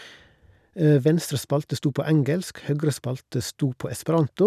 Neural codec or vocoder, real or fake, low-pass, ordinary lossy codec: none; real; 14.4 kHz; none